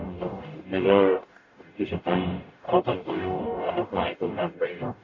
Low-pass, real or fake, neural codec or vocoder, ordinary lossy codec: 7.2 kHz; fake; codec, 44.1 kHz, 0.9 kbps, DAC; AAC, 48 kbps